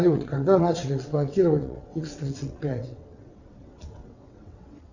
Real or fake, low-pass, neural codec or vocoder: fake; 7.2 kHz; vocoder, 22.05 kHz, 80 mel bands, WaveNeXt